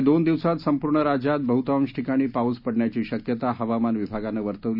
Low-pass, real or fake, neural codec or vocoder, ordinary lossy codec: 5.4 kHz; real; none; none